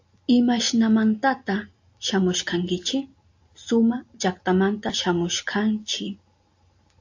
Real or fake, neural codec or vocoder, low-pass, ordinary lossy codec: real; none; 7.2 kHz; AAC, 48 kbps